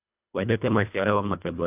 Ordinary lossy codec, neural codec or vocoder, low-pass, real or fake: AAC, 32 kbps; codec, 24 kHz, 1.5 kbps, HILCodec; 3.6 kHz; fake